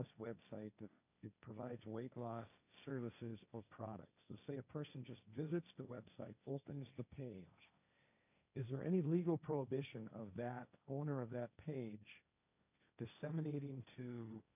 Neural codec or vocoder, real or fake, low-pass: codec, 16 kHz, 1.1 kbps, Voila-Tokenizer; fake; 3.6 kHz